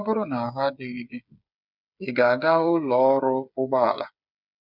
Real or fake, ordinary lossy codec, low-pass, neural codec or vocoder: fake; none; 5.4 kHz; codec, 16 kHz, 8 kbps, FreqCodec, smaller model